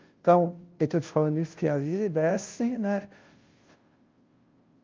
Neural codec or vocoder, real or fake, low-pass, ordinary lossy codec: codec, 16 kHz, 0.5 kbps, FunCodec, trained on Chinese and English, 25 frames a second; fake; 7.2 kHz; Opus, 24 kbps